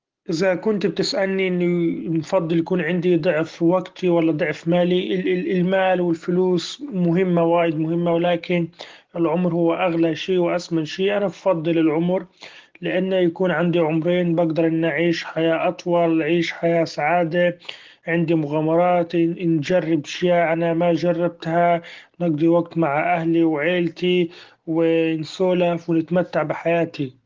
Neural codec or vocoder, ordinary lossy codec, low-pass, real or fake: none; Opus, 16 kbps; 7.2 kHz; real